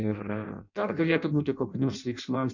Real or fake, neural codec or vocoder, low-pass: fake; codec, 16 kHz in and 24 kHz out, 0.6 kbps, FireRedTTS-2 codec; 7.2 kHz